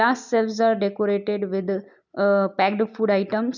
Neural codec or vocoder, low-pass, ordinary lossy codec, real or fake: none; 7.2 kHz; none; real